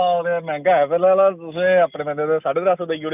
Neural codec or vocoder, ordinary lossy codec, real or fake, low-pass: none; none; real; 3.6 kHz